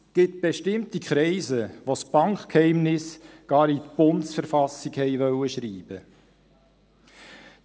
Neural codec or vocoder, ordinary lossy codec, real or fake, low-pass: none; none; real; none